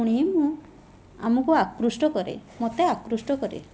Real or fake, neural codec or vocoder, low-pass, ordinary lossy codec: real; none; none; none